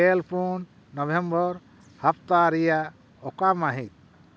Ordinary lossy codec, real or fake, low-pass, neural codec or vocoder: none; real; none; none